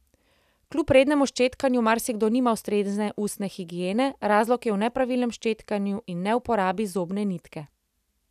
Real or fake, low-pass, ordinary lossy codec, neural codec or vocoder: real; 14.4 kHz; none; none